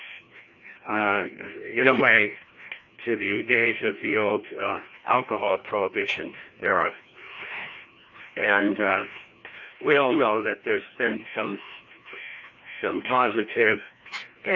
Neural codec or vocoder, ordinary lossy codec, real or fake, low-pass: codec, 16 kHz, 1 kbps, FreqCodec, larger model; AAC, 48 kbps; fake; 7.2 kHz